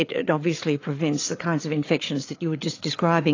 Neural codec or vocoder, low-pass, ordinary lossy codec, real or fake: codec, 16 kHz, 8 kbps, FreqCodec, larger model; 7.2 kHz; AAC, 32 kbps; fake